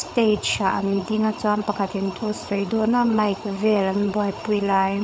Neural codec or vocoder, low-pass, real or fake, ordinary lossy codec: codec, 16 kHz, 8 kbps, FreqCodec, larger model; none; fake; none